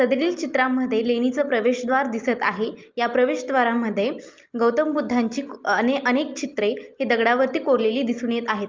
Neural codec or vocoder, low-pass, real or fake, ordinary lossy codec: none; 7.2 kHz; real; Opus, 24 kbps